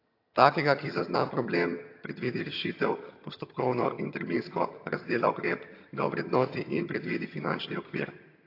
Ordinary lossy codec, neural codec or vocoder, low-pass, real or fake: AAC, 32 kbps; vocoder, 22.05 kHz, 80 mel bands, HiFi-GAN; 5.4 kHz; fake